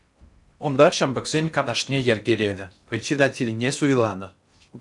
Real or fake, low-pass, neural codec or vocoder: fake; 10.8 kHz; codec, 16 kHz in and 24 kHz out, 0.6 kbps, FocalCodec, streaming, 4096 codes